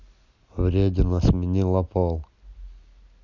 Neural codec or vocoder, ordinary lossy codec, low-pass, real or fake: none; none; 7.2 kHz; real